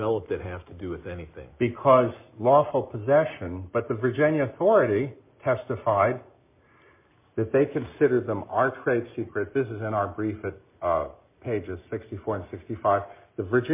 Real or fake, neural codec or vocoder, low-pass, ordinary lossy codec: real; none; 3.6 kHz; MP3, 32 kbps